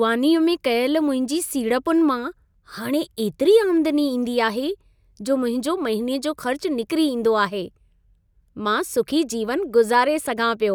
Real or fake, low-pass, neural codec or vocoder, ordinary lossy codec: real; none; none; none